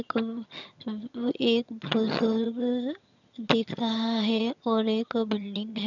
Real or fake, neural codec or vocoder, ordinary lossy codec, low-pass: fake; vocoder, 22.05 kHz, 80 mel bands, HiFi-GAN; none; 7.2 kHz